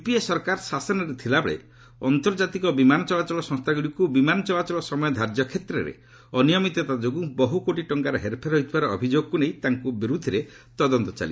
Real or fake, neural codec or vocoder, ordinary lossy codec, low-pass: real; none; none; none